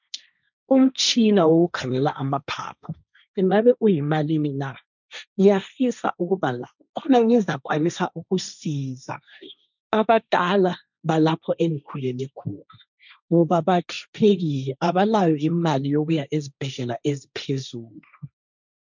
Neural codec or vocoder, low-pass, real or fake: codec, 16 kHz, 1.1 kbps, Voila-Tokenizer; 7.2 kHz; fake